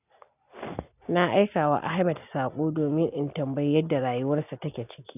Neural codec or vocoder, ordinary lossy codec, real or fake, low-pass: none; none; real; 3.6 kHz